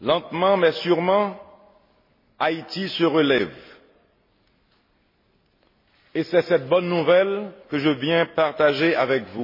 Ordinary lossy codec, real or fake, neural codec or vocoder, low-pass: MP3, 24 kbps; real; none; 5.4 kHz